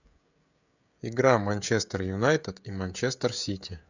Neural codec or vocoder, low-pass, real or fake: codec, 16 kHz, 16 kbps, FreqCodec, smaller model; 7.2 kHz; fake